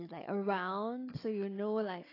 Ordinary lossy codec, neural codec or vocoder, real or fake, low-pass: AAC, 24 kbps; codec, 16 kHz, 16 kbps, FunCodec, trained on Chinese and English, 50 frames a second; fake; 5.4 kHz